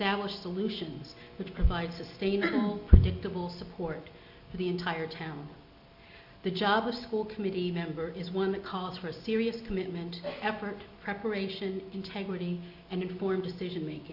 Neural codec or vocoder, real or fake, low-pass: none; real; 5.4 kHz